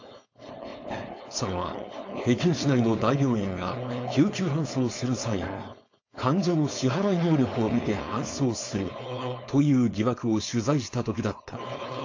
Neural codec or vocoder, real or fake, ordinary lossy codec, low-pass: codec, 16 kHz, 4.8 kbps, FACodec; fake; AAC, 48 kbps; 7.2 kHz